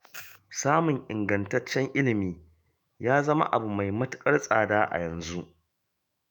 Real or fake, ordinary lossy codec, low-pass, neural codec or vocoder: fake; none; none; autoencoder, 48 kHz, 128 numbers a frame, DAC-VAE, trained on Japanese speech